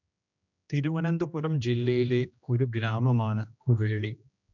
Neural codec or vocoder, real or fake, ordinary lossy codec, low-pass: codec, 16 kHz, 1 kbps, X-Codec, HuBERT features, trained on general audio; fake; none; 7.2 kHz